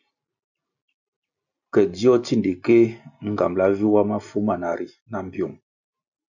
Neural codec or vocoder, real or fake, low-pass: none; real; 7.2 kHz